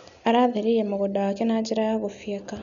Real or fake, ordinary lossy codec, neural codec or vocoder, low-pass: real; none; none; 7.2 kHz